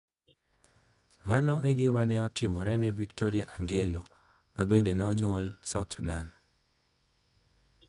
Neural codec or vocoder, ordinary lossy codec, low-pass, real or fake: codec, 24 kHz, 0.9 kbps, WavTokenizer, medium music audio release; none; 10.8 kHz; fake